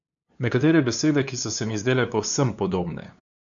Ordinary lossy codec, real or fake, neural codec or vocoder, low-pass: none; fake; codec, 16 kHz, 2 kbps, FunCodec, trained on LibriTTS, 25 frames a second; 7.2 kHz